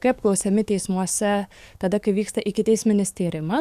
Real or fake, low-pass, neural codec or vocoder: fake; 14.4 kHz; codec, 44.1 kHz, 7.8 kbps, DAC